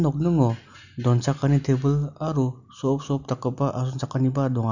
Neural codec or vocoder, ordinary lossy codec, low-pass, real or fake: none; none; 7.2 kHz; real